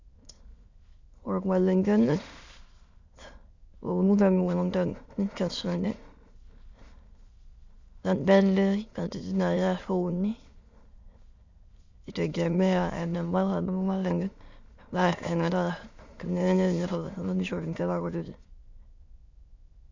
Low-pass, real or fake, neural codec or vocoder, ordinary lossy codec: 7.2 kHz; fake; autoencoder, 22.05 kHz, a latent of 192 numbers a frame, VITS, trained on many speakers; Opus, 64 kbps